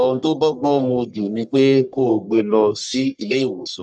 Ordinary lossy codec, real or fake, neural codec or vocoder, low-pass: none; fake; codec, 44.1 kHz, 1.7 kbps, Pupu-Codec; 9.9 kHz